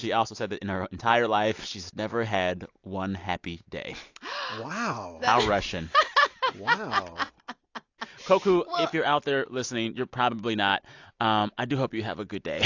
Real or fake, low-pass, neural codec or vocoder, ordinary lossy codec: real; 7.2 kHz; none; AAC, 48 kbps